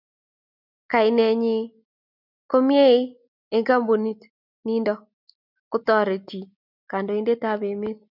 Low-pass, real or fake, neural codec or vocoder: 5.4 kHz; real; none